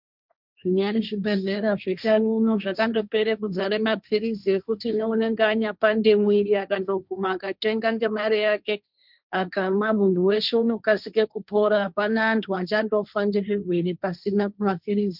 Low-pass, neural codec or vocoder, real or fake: 5.4 kHz; codec, 16 kHz, 1.1 kbps, Voila-Tokenizer; fake